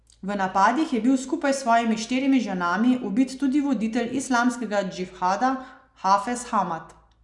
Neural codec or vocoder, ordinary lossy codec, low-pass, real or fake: none; none; 10.8 kHz; real